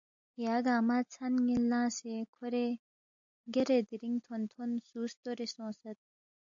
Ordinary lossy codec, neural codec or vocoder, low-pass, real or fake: AAC, 64 kbps; none; 7.2 kHz; real